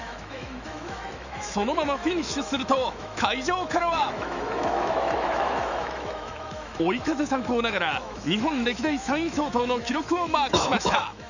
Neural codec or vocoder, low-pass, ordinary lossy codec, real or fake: autoencoder, 48 kHz, 128 numbers a frame, DAC-VAE, trained on Japanese speech; 7.2 kHz; none; fake